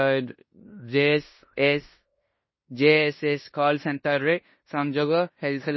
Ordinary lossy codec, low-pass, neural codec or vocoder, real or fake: MP3, 24 kbps; 7.2 kHz; codec, 16 kHz in and 24 kHz out, 0.9 kbps, LongCat-Audio-Codec, fine tuned four codebook decoder; fake